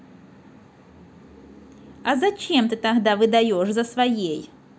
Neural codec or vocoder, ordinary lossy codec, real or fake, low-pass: none; none; real; none